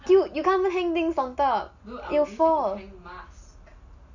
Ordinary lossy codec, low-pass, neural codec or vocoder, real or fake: none; 7.2 kHz; none; real